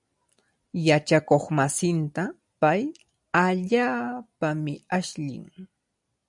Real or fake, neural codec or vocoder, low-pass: real; none; 10.8 kHz